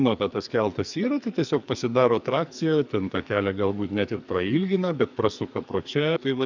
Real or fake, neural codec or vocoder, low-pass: fake; codec, 44.1 kHz, 2.6 kbps, SNAC; 7.2 kHz